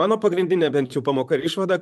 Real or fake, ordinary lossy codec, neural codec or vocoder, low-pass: fake; MP3, 96 kbps; vocoder, 44.1 kHz, 128 mel bands, Pupu-Vocoder; 14.4 kHz